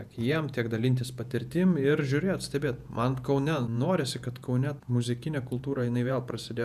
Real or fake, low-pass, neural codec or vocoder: fake; 14.4 kHz; vocoder, 48 kHz, 128 mel bands, Vocos